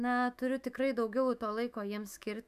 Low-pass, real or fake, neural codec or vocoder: 14.4 kHz; fake; autoencoder, 48 kHz, 128 numbers a frame, DAC-VAE, trained on Japanese speech